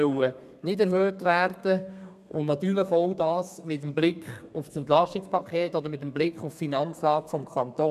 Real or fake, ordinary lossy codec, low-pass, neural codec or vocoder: fake; none; 14.4 kHz; codec, 32 kHz, 1.9 kbps, SNAC